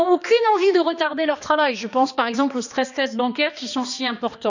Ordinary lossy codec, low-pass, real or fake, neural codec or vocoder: none; 7.2 kHz; fake; codec, 16 kHz, 2 kbps, X-Codec, HuBERT features, trained on balanced general audio